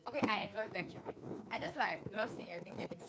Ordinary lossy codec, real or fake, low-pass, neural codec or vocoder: none; fake; none; codec, 16 kHz, 2 kbps, FreqCodec, larger model